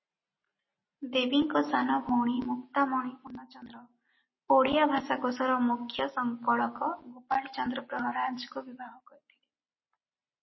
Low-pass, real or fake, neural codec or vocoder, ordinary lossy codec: 7.2 kHz; real; none; MP3, 24 kbps